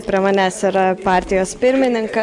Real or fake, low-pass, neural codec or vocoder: real; 10.8 kHz; none